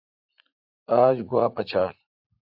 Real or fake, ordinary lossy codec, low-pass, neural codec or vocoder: fake; AAC, 48 kbps; 5.4 kHz; vocoder, 44.1 kHz, 80 mel bands, Vocos